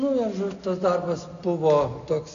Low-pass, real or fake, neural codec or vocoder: 7.2 kHz; real; none